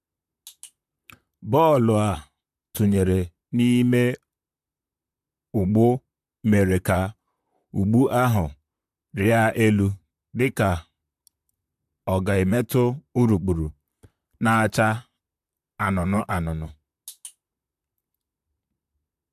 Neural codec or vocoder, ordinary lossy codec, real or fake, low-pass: vocoder, 44.1 kHz, 128 mel bands, Pupu-Vocoder; AAC, 96 kbps; fake; 14.4 kHz